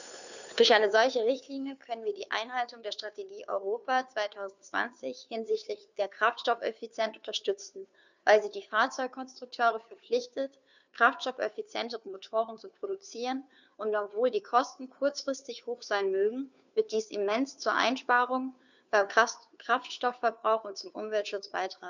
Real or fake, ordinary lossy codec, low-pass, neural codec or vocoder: fake; none; 7.2 kHz; codec, 16 kHz, 2 kbps, FunCodec, trained on Chinese and English, 25 frames a second